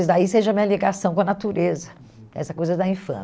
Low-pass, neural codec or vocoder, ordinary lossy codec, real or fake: none; none; none; real